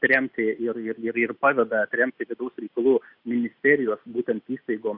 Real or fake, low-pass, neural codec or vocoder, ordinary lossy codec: real; 5.4 kHz; none; Opus, 64 kbps